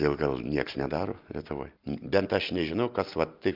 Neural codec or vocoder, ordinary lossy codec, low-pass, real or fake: none; Opus, 32 kbps; 5.4 kHz; real